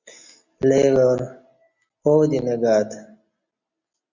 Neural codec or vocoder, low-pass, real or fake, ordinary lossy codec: none; 7.2 kHz; real; Opus, 64 kbps